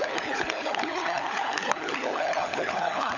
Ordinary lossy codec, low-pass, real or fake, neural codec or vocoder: none; 7.2 kHz; fake; codec, 16 kHz, 16 kbps, FunCodec, trained on LibriTTS, 50 frames a second